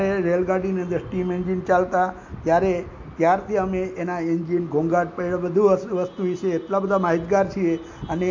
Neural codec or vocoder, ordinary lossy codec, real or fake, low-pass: none; MP3, 48 kbps; real; 7.2 kHz